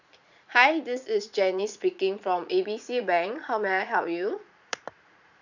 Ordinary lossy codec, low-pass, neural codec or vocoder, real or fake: none; 7.2 kHz; vocoder, 44.1 kHz, 80 mel bands, Vocos; fake